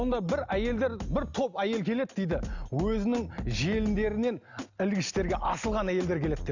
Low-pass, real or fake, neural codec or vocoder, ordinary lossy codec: 7.2 kHz; real; none; none